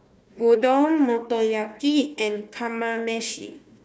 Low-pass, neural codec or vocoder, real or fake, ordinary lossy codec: none; codec, 16 kHz, 1 kbps, FunCodec, trained on Chinese and English, 50 frames a second; fake; none